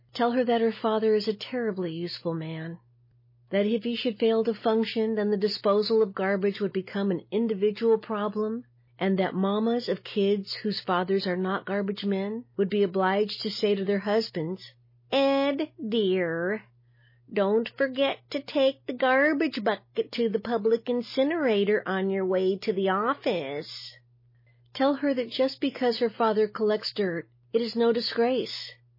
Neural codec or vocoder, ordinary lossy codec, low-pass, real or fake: none; MP3, 24 kbps; 5.4 kHz; real